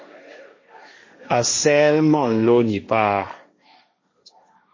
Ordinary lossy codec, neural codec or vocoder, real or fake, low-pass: MP3, 32 kbps; codec, 16 kHz, 1.1 kbps, Voila-Tokenizer; fake; 7.2 kHz